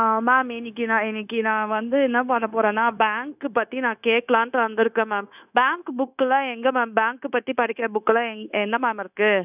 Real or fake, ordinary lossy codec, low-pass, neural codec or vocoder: fake; none; 3.6 kHz; codec, 16 kHz, 0.9 kbps, LongCat-Audio-Codec